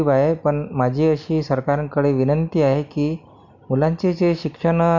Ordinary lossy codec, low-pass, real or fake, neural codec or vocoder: none; 7.2 kHz; real; none